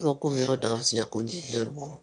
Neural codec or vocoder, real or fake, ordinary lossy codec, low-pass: autoencoder, 22.05 kHz, a latent of 192 numbers a frame, VITS, trained on one speaker; fake; none; 9.9 kHz